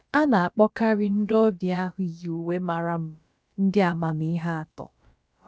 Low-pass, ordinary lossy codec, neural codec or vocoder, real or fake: none; none; codec, 16 kHz, about 1 kbps, DyCAST, with the encoder's durations; fake